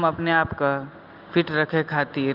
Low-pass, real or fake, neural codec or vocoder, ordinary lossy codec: 5.4 kHz; real; none; Opus, 32 kbps